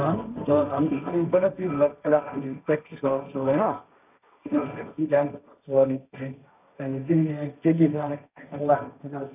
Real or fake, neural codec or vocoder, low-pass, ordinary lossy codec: fake; codec, 16 kHz, 1.1 kbps, Voila-Tokenizer; 3.6 kHz; none